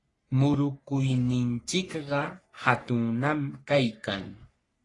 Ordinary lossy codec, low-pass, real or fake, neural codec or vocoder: AAC, 32 kbps; 10.8 kHz; fake; codec, 44.1 kHz, 3.4 kbps, Pupu-Codec